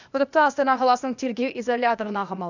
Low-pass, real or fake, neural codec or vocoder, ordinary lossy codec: 7.2 kHz; fake; codec, 16 kHz, 0.8 kbps, ZipCodec; none